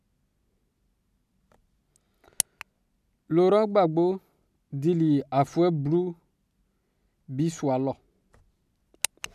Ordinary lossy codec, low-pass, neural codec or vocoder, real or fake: none; 14.4 kHz; none; real